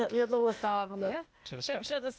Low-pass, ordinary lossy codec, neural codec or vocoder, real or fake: none; none; codec, 16 kHz, 0.8 kbps, ZipCodec; fake